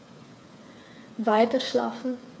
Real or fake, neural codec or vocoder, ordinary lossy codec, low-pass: fake; codec, 16 kHz, 8 kbps, FreqCodec, smaller model; none; none